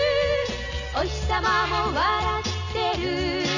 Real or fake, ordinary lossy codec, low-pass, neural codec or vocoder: real; none; 7.2 kHz; none